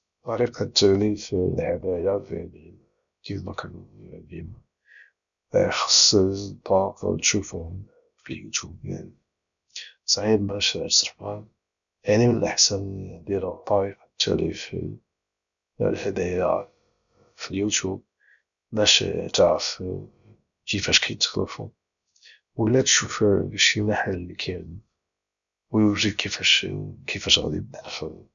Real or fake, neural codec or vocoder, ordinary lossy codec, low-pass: fake; codec, 16 kHz, about 1 kbps, DyCAST, with the encoder's durations; none; 7.2 kHz